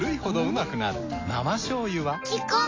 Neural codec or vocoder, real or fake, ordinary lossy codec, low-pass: none; real; AAC, 32 kbps; 7.2 kHz